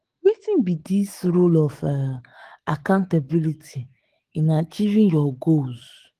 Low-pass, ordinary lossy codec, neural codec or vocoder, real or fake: 14.4 kHz; Opus, 16 kbps; autoencoder, 48 kHz, 128 numbers a frame, DAC-VAE, trained on Japanese speech; fake